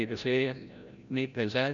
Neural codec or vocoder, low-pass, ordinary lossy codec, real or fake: codec, 16 kHz, 0.5 kbps, FreqCodec, larger model; 7.2 kHz; none; fake